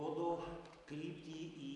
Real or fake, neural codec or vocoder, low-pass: real; none; 10.8 kHz